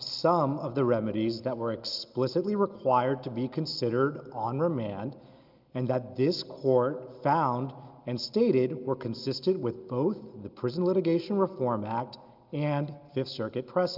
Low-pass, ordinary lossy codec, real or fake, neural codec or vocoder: 5.4 kHz; Opus, 24 kbps; real; none